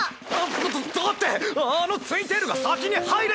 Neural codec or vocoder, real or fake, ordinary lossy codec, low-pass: none; real; none; none